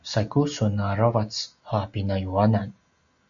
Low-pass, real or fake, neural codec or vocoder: 7.2 kHz; real; none